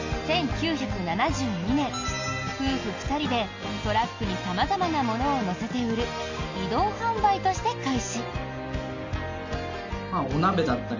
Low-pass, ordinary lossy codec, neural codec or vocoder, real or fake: 7.2 kHz; AAC, 48 kbps; none; real